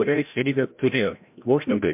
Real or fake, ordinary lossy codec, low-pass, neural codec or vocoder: fake; MP3, 32 kbps; 3.6 kHz; codec, 16 kHz, 0.5 kbps, FreqCodec, larger model